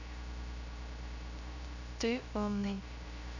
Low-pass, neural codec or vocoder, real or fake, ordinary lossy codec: 7.2 kHz; codec, 16 kHz, 0.3 kbps, FocalCodec; fake; none